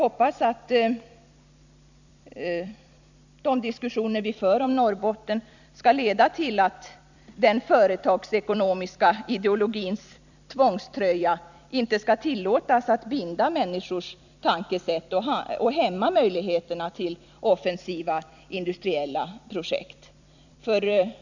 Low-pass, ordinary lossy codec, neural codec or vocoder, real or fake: 7.2 kHz; none; vocoder, 44.1 kHz, 128 mel bands every 256 samples, BigVGAN v2; fake